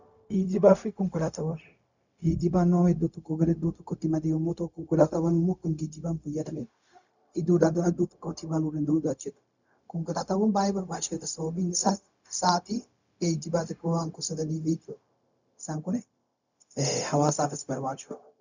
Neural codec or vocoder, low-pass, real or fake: codec, 16 kHz, 0.4 kbps, LongCat-Audio-Codec; 7.2 kHz; fake